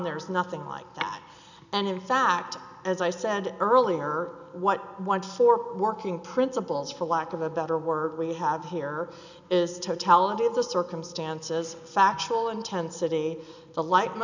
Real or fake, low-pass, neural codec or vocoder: real; 7.2 kHz; none